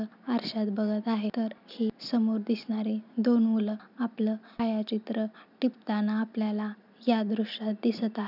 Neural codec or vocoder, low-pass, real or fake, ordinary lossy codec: none; 5.4 kHz; real; none